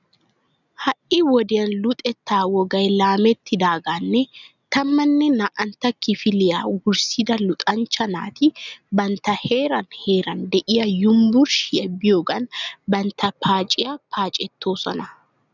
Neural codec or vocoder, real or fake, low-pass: none; real; 7.2 kHz